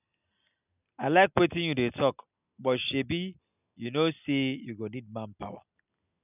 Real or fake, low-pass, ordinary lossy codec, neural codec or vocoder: real; 3.6 kHz; none; none